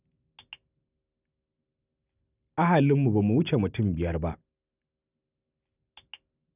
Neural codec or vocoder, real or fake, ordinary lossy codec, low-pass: none; real; none; 3.6 kHz